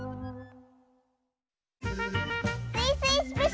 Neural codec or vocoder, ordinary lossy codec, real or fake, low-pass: none; none; real; none